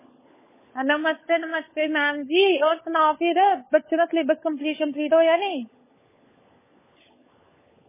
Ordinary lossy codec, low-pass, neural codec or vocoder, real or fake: MP3, 16 kbps; 3.6 kHz; codec, 16 kHz, 16 kbps, FunCodec, trained on LibriTTS, 50 frames a second; fake